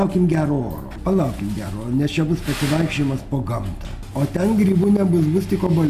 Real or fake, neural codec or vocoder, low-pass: real; none; 14.4 kHz